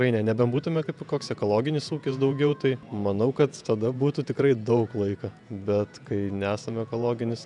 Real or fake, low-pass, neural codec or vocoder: fake; 10.8 kHz; vocoder, 48 kHz, 128 mel bands, Vocos